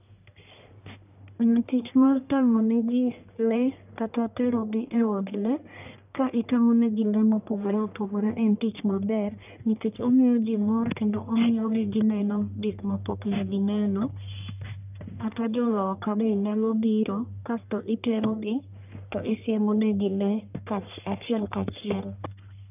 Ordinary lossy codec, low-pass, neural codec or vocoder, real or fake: none; 3.6 kHz; codec, 44.1 kHz, 1.7 kbps, Pupu-Codec; fake